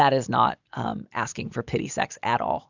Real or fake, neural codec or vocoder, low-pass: real; none; 7.2 kHz